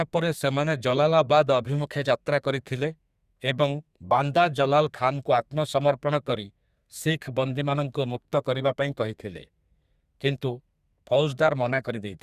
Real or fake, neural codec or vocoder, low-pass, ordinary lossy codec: fake; codec, 32 kHz, 1.9 kbps, SNAC; 14.4 kHz; Opus, 64 kbps